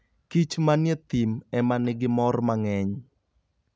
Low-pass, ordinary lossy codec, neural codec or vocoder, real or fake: none; none; none; real